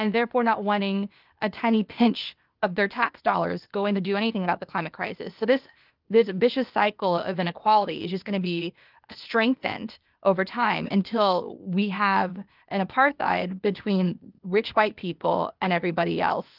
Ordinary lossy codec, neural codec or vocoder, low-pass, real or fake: Opus, 24 kbps; codec, 16 kHz, 0.8 kbps, ZipCodec; 5.4 kHz; fake